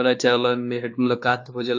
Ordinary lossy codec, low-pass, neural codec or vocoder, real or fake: AAC, 48 kbps; 7.2 kHz; codec, 16 kHz, 2 kbps, X-Codec, WavLM features, trained on Multilingual LibriSpeech; fake